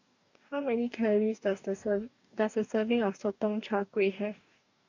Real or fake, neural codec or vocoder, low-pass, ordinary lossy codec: fake; codec, 44.1 kHz, 2.6 kbps, DAC; 7.2 kHz; none